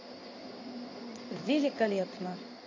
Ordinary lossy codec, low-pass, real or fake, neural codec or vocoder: MP3, 32 kbps; 7.2 kHz; fake; codec, 16 kHz in and 24 kHz out, 1 kbps, XY-Tokenizer